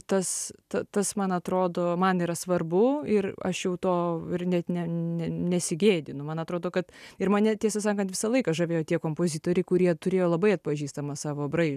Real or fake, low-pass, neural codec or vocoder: real; 14.4 kHz; none